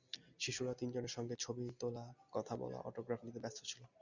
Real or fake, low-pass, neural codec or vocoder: real; 7.2 kHz; none